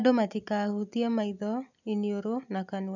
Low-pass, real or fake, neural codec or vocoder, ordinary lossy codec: 7.2 kHz; real; none; none